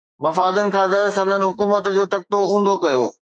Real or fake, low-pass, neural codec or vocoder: fake; 9.9 kHz; codec, 32 kHz, 1.9 kbps, SNAC